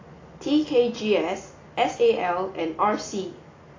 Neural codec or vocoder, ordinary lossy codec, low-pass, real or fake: none; AAC, 32 kbps; 7.2 kHz; real